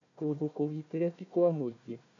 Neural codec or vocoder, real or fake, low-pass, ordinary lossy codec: codec, 16 kHz, 1 kbps, FunCodec, trained on Chinese and English, 50 frames a second; fake; 7.2 kHz; none